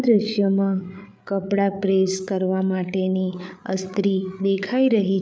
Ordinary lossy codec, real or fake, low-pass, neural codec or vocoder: none; fake; none; codec, 16 kHz, 8 kbps, FreqCodec, larger model